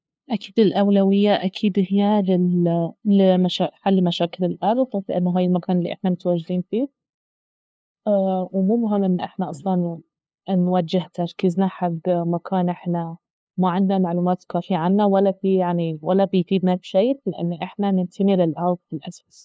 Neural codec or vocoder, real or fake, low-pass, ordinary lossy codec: codec, 16 kHz, 2 kbps, FunCodec, trained on LibriTTS, 25 frames a second; fake; none; none